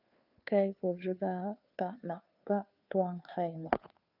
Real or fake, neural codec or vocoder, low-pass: fake; codec, 16 kHz, 2 kbps, FunCodec, trained on Chinese and English, 25 frames a second; 5.4 kHz